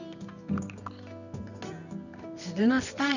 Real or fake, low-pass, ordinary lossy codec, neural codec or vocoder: fake; 7.2 kHz; none; codec, 24 kHz, 0.9 kbps, WavTokenizer, medium music audio release